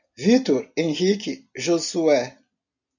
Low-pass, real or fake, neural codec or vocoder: 7.2 kHz; real; none